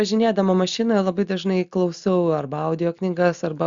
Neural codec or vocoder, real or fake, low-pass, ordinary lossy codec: none; real; 7.2 kHz; Opus, 64 kbps